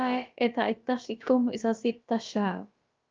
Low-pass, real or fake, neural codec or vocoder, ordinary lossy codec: 7.2 kHz; fake; codec, 16 kHz, about 1 kbps, DyCAST, with the encoder's durations; Opus, 24 kbps